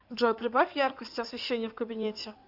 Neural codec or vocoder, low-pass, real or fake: codec, 16 kHz in and 24 kHz out, 2.2 kbps, FireRedTTS-2 codec; 5.4 kHz; fake